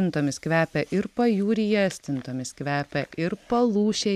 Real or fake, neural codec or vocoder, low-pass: real; none; 14.4 kHz